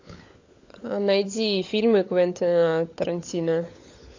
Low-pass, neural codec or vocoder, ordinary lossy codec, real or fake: 7.2 kHz; codec, 16 kHz, 16 kbps, FunCodec, trained on LibriTTS, 50 frames a second; AAC, 48 kbps; fake